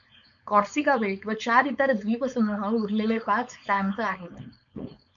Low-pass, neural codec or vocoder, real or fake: 7.2 kHz; codec, 16 kHz, 4.8 kbps, FACodec; fake